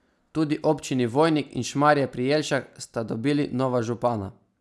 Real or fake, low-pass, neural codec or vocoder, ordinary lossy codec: real; none; none; none